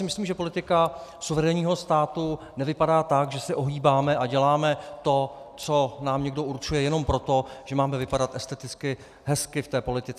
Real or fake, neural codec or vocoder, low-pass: real; none; 14.4 kHz